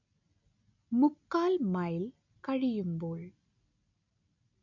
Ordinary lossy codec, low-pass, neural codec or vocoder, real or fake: none; 7.2 kHz; none; real